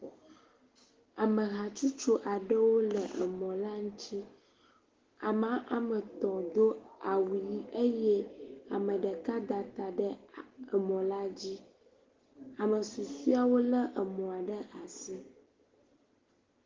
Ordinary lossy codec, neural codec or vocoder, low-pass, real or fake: Opus, 16 kbps; none; 7.2 kHz; real